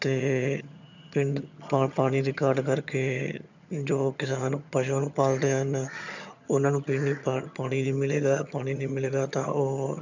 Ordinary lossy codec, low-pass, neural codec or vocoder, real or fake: none; 7.2 kHz; vocoder, 22.05 kHz, 80 mel bands, HiFi-GAN; fake